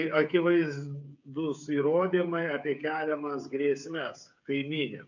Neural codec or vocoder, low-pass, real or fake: codec, 16 kHz, 8 kbps, FreqCodec, smaller model; 7.2 kHz; fake